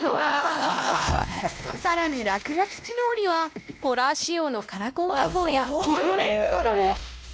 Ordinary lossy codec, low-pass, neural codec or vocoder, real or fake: none; none; codec, 16 kHz, 1 kbps, X-Codec, WavLM features, trained on Multilingual LibriSpeech; fake